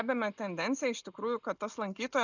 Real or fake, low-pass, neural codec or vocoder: real; 7.2 kHz; none